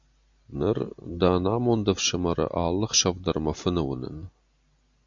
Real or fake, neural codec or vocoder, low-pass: real; none; 7.2 kHz